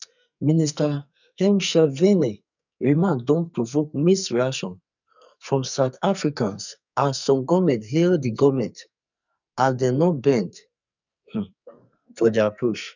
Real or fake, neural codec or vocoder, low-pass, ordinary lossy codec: fake; codec, 44.1 kHz, 2.6 kbps, SNAC; 7.2 kHz; none